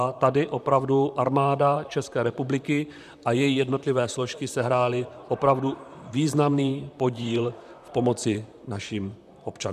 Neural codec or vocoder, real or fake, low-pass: vocoder, 44.1 kHz, 128 mel bands, Pupu-Vocoder; fake; 14.4 kHz